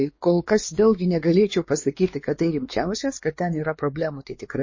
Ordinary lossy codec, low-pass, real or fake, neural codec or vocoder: MP3, 32 kbps; 7.2 kHz; fake; codec, 16 kHz, 2 kbps, X-Codec, WavLM features, trained on Multilingual LibriSpeech